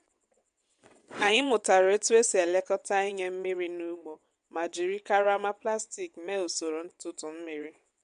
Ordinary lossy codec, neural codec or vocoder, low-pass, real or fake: MP3, 64 kbps; vocoder, 22.05 kHz, 80 mel bands, WaveNeXt; 9.9 kHz; fake